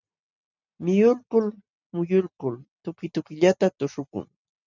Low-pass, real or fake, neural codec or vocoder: 7.2 kHz; real; none